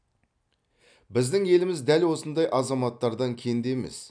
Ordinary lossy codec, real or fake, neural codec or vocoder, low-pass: none; real; none; 9.9 kHz